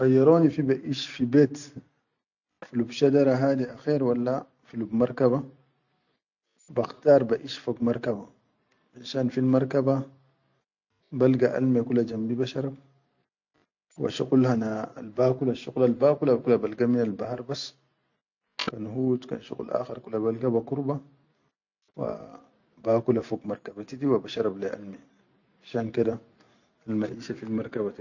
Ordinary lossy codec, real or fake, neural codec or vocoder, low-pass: none; real; none; 7.2 kHz